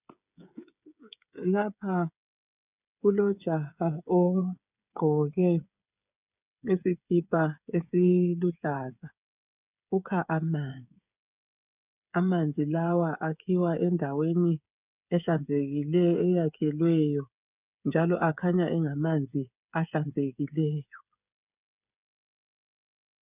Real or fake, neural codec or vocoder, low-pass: fake; codec, 16 kHz, 8 kbps, FreqCodec, smaller model; 3.6 kHz